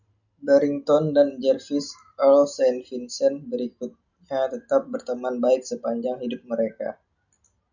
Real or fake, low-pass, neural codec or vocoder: real; 7.2 kHz; none